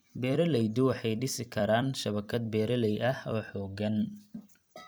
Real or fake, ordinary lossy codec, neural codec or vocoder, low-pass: fake; none; vocoder, 44.1 kHz, 128 mel bands every 256 samples, BigVGAN v2; none